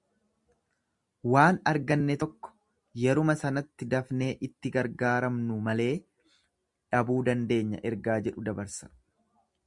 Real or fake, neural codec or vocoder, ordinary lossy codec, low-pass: real; none; Opus, 64 kbps; 10.8 kHz